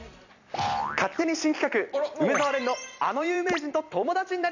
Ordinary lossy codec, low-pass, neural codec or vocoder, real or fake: none; 7.2 kHz; vocoder, 44.1 kHz, 128 mel bands every 256 samples, BigVGAN v2; fake